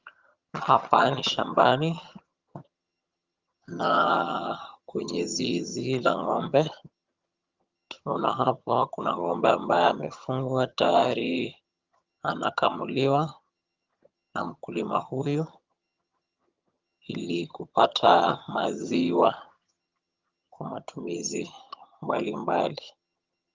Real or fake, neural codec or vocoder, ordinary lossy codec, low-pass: fake; vocoder, 22.05 kHz, 80 mel bands, HiFi-GAN; Opus, 32 kbps; 7.2 kHz